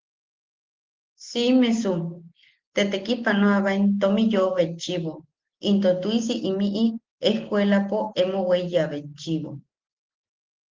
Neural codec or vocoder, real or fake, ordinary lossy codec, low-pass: none; real; Opus, 16 kbps; 7.2 kHz